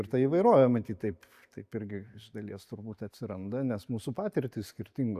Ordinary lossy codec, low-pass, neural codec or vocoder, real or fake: AAC, 96 kbps; 14.4 kHz; none; real